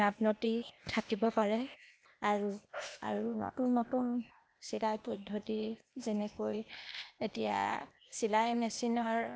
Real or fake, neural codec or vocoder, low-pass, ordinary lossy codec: fake; codec, 16 kHz, 0.8 kbps, ZipCodec; none; none